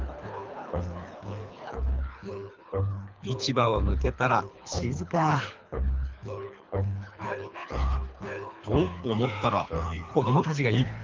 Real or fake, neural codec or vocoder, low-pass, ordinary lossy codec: fake; codec, 24 kHz, 3 kbps, HILCodec; 7.2 kHz; Opus, 24 kbps